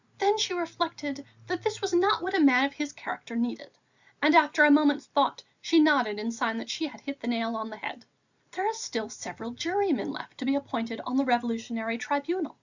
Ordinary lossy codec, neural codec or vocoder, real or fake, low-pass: Opus, 64 kbps; none; real; 7.2 kHz